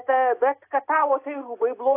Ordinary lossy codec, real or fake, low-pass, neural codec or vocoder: AAC, 24 kbps; real; 3.6 kHz; none